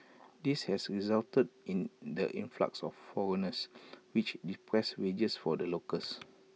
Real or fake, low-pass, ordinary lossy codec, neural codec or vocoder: real; none; none; none